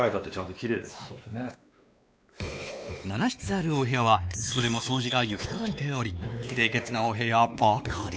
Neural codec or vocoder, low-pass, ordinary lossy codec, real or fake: codec, 16 kHz, 2 kbps, X-Codec, WavLM features, trained on Multilingual LibriSpeech; none; none; fake